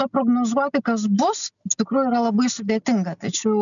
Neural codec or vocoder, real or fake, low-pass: none; real; 7.2 kHz